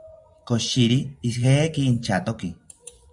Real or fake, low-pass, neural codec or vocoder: fake; 10.8 kHz; vocoder, 24 kHz, 100 mel bands, Vocos